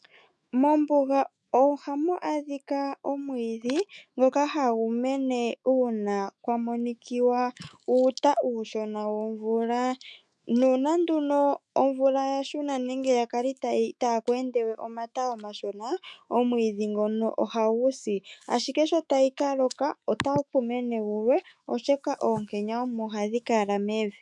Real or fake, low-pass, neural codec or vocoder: fake; 10.8 kHz; autoencoder, 48 kHz, 128 numbers a frame, DAC-VAE, trained on Japanese speech